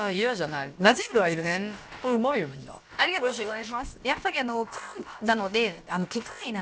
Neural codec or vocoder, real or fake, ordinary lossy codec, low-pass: codec, 16 kHz, about 1 kbps, DyCAST, with the encoder's durations; fake; none; none